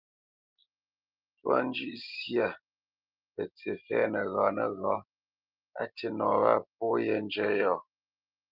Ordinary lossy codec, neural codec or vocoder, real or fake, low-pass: Opus, 32 kbps; none; real; 5.4 kHz